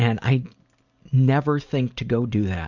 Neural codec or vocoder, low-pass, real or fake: none; 7.2 kHz; real